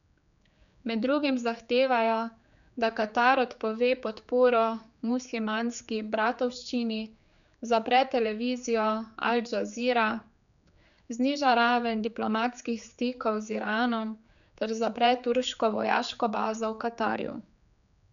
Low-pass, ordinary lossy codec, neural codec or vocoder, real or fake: 7.2 kHz; none; codec, 16 kHz, 4 kbps, X-Codec, HuBERT features, trained on general audio; fake